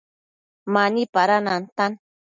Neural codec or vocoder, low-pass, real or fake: none; 7.2 kHz; real